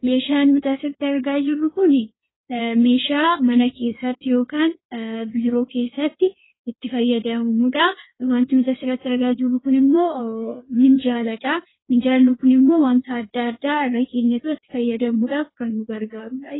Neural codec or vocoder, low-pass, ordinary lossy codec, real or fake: codec, 16 kHz in and 24 kHz out, 1.1 kbps, FireRedTTS-2 codec; 7.2 kHz; AAC, 16 kbps; fake